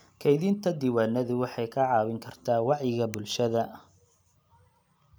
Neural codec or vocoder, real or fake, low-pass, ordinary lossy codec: none; real; none; none